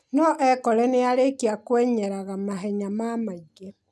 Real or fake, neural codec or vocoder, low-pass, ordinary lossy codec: real; none; none; none